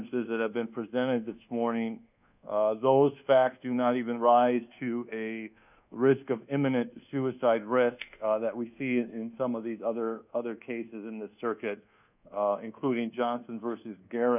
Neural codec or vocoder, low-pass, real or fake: codec, 24 kHz, 1.2 kbps, DualCodec; 3.6 kHz; fake